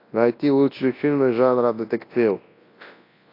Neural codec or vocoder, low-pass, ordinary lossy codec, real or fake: codec, 24 kHz, 0.9 kbps, WavTokenizer, large speech release; 5.4 kHz; AAC, 32 kbps; fake